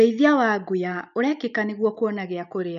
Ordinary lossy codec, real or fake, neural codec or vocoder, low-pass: none; real; none; 7.2 kHz